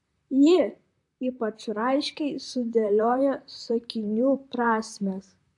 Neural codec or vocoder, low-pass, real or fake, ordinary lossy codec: vocoder, 44.1 kHz, 128 mel bands, Pupu-Vocoder; 10.8 kHz; fake; AAC, 64 kbps